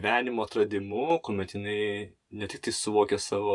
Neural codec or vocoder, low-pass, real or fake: vocoder, 44.1 kHz, 128 mel bands every 512 samples, BigVGAN v2; 10.8 kHz; fake